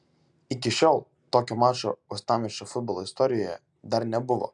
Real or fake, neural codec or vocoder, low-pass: real; none; 10.8 kHz